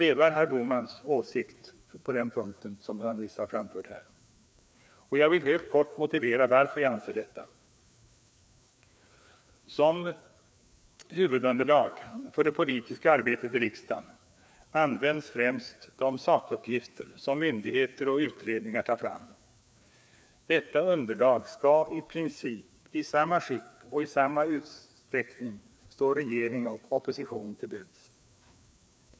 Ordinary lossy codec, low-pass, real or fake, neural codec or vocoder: none; none; fake; codec, 16 kHz, 2 kbps, FreqCodec, larger model